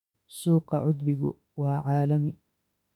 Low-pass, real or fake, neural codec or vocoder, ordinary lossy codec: 19.8 kHz; fake; autoencoder, 48 kHz, 32 numbers a frame, DAC-VAE, trained on Japanese speech; none